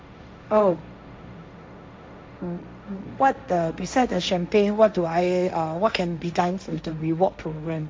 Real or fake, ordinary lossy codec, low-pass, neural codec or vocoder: fake; none; none; codec, 16 kHz, 1.1 kbps, Voila-Tokenizer